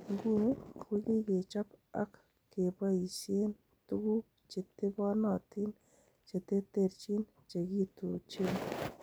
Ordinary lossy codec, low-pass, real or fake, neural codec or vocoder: none; none; real; none